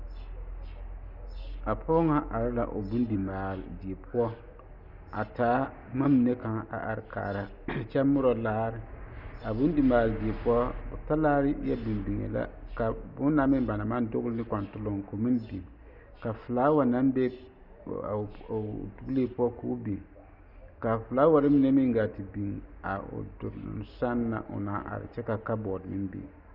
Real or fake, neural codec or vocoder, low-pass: real; none; 5.4 kHz